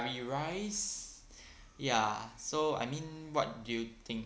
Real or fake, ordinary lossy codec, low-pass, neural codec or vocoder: real; none; none; none